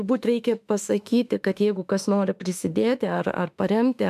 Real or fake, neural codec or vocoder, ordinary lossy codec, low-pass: fake; autoencoder, 48 kHz, 32 numbers a frame, DAC-VAE, trained on Japanese speech; MP3, 96 kbps; 14.4 kHz